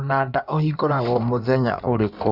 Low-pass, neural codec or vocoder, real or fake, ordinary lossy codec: 5.4 kHz; codec, 16 kHz in and 24 kHz out, 1.1 kbps, FireRedTTS-2 codec; fake; none